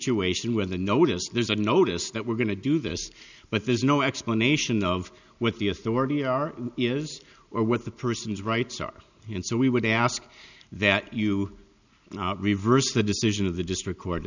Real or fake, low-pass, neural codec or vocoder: real; 7.2 kHz; none